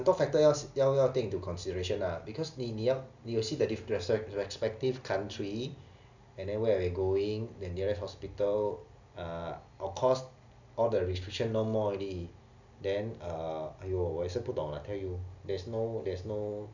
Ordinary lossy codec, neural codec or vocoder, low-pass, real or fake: none; none; 7.2 kHz; real